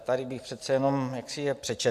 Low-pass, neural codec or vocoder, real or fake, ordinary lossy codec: 14.4 kHz; vocoder, 44.1 kHz, 128 mel bands every 256 samples, BigVGAN v2; fake; Opus, 64 kbps